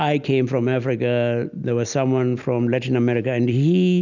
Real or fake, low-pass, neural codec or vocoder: real; 7.2 kHz; none